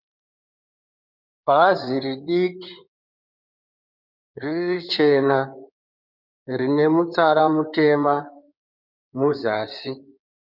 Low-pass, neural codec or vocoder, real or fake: 5.4 kHz; codec, 16 kHz in and 24 kHz out, 2.2 kbps, FireRedTTS-2 codec; fake